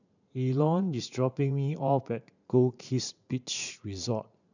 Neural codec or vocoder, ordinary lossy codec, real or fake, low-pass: vocoder, 44.1 kHz, 80 mel bands, Vocos; none; fake; 7.2 kHz